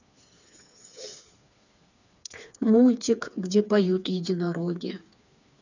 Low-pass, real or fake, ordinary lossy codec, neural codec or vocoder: 7.2 kHz; fake; none; codec, 16 kHz, 4 kbps, FreqCodec, smaller model